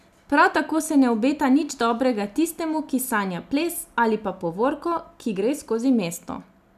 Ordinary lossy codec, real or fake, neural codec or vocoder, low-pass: none; real; none; 14.4 kHz